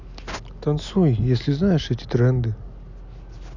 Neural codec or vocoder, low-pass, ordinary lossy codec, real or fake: none; 7.2 kHz; none; real